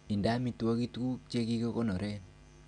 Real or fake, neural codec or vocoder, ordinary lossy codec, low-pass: real; none; none; 9.9 kHz